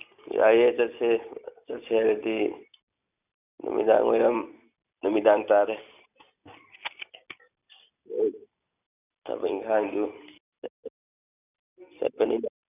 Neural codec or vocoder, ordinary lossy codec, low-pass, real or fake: none; none; 3.6 kHz; real